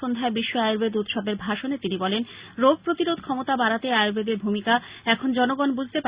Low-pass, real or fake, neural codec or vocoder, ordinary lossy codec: 3.6 kHz; real; none; Opus, 64 kbps